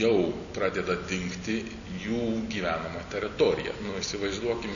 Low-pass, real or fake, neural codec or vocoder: 7.2 kHz; real; none